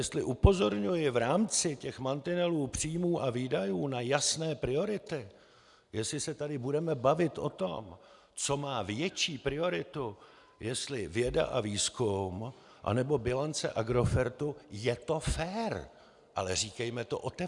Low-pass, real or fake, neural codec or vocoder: 10.8 kHz; real; none